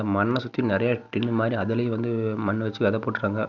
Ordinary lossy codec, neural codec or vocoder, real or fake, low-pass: none; none; real; 7.2 kHz